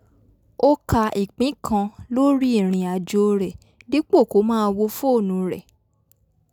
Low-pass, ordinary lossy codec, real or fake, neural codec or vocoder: 19.8 kHz; none; real; none